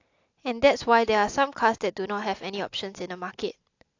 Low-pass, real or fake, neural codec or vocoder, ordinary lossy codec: 7.2 kHz; real; none; AAC, 48 kbps